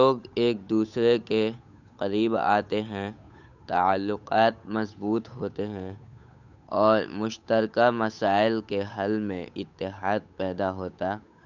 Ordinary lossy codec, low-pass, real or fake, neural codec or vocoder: none; 7.2 kHz; fake; codec, 16 kHz, 8 kbps, FunCodec, trained on Chinese and English, 25 frames a second